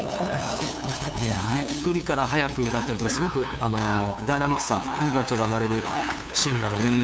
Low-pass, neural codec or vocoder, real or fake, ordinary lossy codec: none; codec, 16 kHz, 2 kbps, FunCodec, trained on LibriTTS, 25 frames a second; fake; none